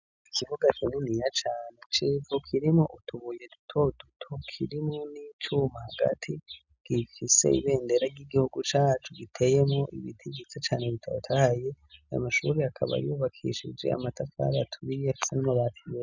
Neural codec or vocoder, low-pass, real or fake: none; 7.2 kHz; real